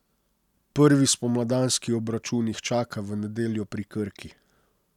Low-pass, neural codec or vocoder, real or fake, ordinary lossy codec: 19.8 kHz; none; real; none